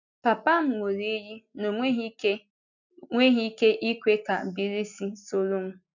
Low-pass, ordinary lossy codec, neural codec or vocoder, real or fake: 7.2 kHz; none; none; real